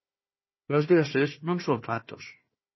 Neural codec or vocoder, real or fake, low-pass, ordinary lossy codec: codec, 16 kHz, 1 kbps, FunCodec, trained on Chinese and English, 50 frames a second; fake; 7.2 kHz; MP3, 24 kbps